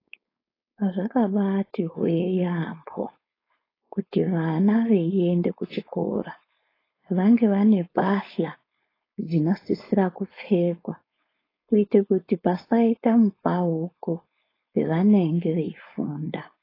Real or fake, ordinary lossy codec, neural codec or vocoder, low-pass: fake; AAC, 24 kbps; codec, 16 kHz, 4.8 kbps, FACodec; 5.4 kHz